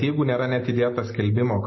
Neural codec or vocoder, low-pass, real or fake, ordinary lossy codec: none; 7.2 kHz; real; MP3, 24 kbps